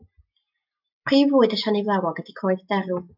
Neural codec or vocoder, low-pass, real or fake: none; 5.4 kHz; real